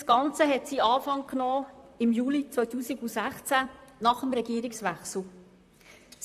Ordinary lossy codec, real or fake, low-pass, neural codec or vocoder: AAC, 96 kbps; fake; 14.4 kHz; vocoder, 44.1 kHz, 128 mel bands, Pupu-Vocoder